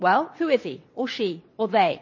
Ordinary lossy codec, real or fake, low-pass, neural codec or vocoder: MP3, 32 kbps; fake; 7.2 kHz; vocoder, 22.05 kHz, 80 mel bands, WaveNeXt